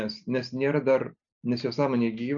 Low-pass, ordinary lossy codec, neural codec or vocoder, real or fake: 7.2 kHz; MP3, 96 kbps; none; real